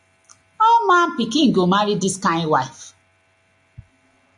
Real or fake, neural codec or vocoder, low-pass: real; none; 10.8 kHz